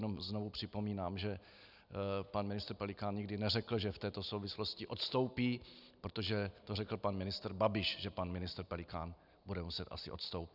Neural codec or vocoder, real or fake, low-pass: none; real; 5.4 kHz